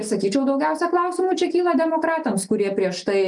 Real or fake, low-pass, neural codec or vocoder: real; 10.8 kHz; none